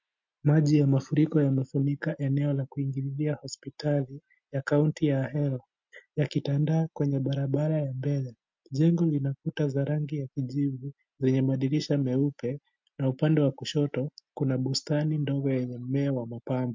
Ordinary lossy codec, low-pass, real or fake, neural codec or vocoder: MP3, 48 kbps; 7.2 kHz; real; none